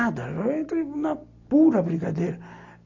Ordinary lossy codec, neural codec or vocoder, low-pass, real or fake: AAC, 48 kbps; none; 7.2 kHz; real